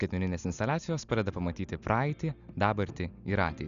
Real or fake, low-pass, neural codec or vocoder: real; 7.2 kHz; none